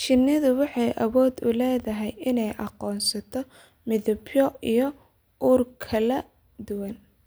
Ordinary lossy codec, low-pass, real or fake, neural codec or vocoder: none; none; real; none